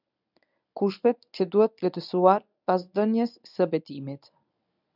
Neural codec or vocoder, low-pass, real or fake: codec, 24 kHz, 0.9 kbps, WavTokenizer, medium speech release version 1; 5.4 kHz; fake